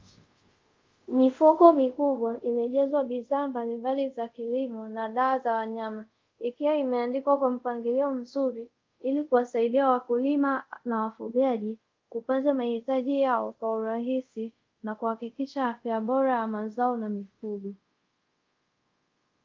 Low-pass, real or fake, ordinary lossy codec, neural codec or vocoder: 7.2 kHz; fake; Opus, 24 kbps; codec, 24 kHz, 0.5 kbps, DualCodec